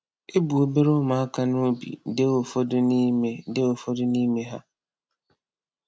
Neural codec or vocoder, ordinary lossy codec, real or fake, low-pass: none; none; real; none